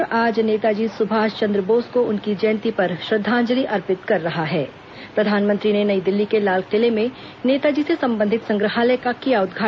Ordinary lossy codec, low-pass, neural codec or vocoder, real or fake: none; none; none; real